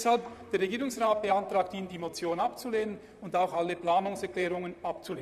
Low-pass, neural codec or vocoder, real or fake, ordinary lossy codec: 14.4 kHz; vocoder, 44.1 kHz, 128 mel bands, Pupu-Vocoder; fake; none